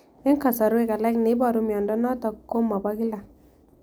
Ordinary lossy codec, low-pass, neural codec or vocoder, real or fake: none; none; none; real